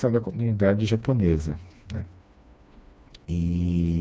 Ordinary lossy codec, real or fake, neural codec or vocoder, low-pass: none; fake; codec, 16 kHz, 2 kbps, FreqCodec, smaller model; none